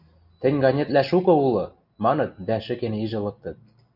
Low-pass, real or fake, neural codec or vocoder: 5.4 kHz; real; none